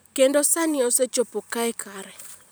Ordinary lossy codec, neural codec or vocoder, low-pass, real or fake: none; vocoder, 44.1 kHz, 128 mel bands every 512 samples, BigVGAN v2; none; fake